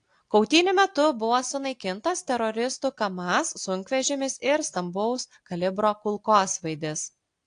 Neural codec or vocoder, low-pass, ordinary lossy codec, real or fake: none; 9.9 kHz; AAC, 48 kbps; real